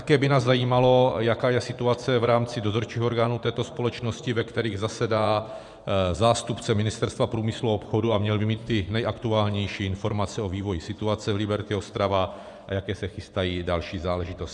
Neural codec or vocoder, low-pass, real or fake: vocoder, 24 kHz, 100 mel bands, Vocos; 10.8 kHz; fake